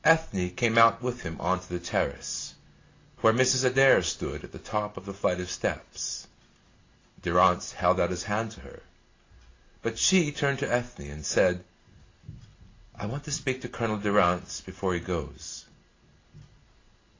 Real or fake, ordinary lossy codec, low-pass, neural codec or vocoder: real; AAC, 32 kbps; 7.2 kHz; none